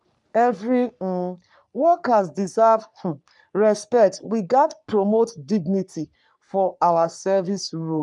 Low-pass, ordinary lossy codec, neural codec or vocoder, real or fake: 10.8 kHz; none; codec, 44.1 kHz, 3.4 kbps, Pupu-Codec; fake